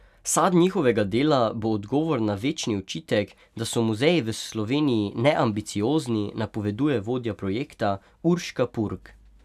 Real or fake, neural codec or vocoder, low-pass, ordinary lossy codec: real; none; 14.4 kHz; none